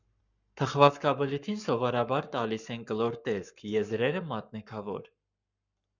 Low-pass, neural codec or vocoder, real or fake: 7.2 kHz; codec, 44.1 kHz, 7.8 kbps, Pupu-Codec; fake